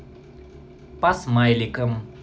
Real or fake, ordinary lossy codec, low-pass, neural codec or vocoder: real; none; none; none